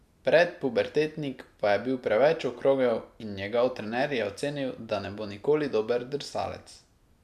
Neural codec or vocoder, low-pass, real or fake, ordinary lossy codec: none; 14.4 kHz; real; none